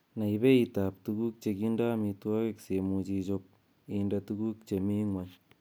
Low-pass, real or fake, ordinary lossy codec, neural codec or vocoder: none; real; none; none